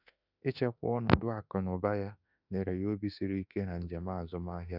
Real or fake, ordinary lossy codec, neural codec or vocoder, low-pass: fake; none; autoencoder, 48 kHz, 32 numbers a frame, DAC-VAE, trained on Japanese speech; 5.4 kHz